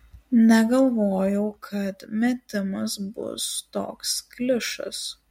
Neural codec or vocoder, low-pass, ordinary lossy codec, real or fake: none; 19.8 kHz; MP3, 64 kbps; real